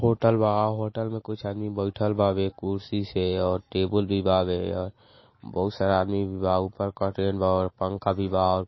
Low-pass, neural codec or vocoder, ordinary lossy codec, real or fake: 7.2 kHz; none; MP3, 24 kbps; real